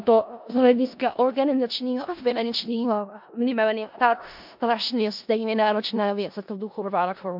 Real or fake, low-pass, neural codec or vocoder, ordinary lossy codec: fake; 5.4 kHz; codec, 16 kHz in and 24 kHz out, 0.4 kbps, LongCat-Audio-Codec, four codebook decoder; MP3, 48 kbps